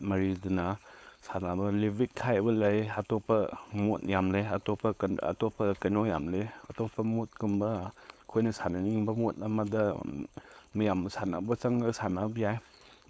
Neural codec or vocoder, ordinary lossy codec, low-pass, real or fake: codec, 16 kHz, 4.8 kbps, FACodec; none; none; fake